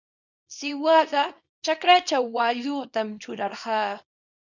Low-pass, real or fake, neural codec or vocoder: 7.2 kHz; fake; codec, 24 kHz, 0.9 kbps, WavTokenizer, small release